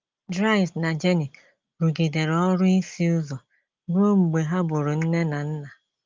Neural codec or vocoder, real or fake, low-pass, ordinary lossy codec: none; real; 7.2 kHz; Opus, 32 kbps